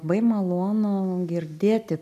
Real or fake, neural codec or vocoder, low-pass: real; none; 14.4 kHz